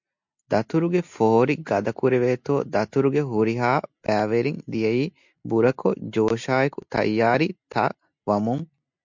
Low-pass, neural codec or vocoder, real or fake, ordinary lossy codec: 7.2 kHz; none; real; MP3, 64 kbps